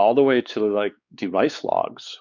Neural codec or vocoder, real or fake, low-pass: codec, 16 kHz, 4 kbps, X-Codec, WavLM features, trained on Multilingual LibriSpeech; fake; 7.2 kHz